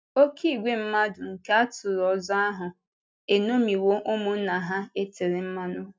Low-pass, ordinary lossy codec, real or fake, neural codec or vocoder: none; none; real; none